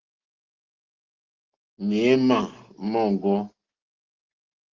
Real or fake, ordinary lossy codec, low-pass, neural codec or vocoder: real; Opus, 16 kbps; 7.2 kHz; none